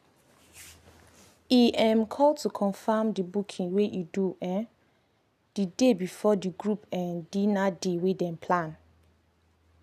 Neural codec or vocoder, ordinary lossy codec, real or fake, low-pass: none; none; real; 14.4 kHz